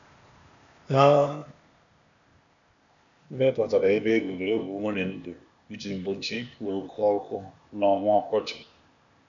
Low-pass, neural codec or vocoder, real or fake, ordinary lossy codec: 7.2 kHz; codec, 16 kHz, 0.8 kbps, ZipCodec; fake; MP3, 96 kbps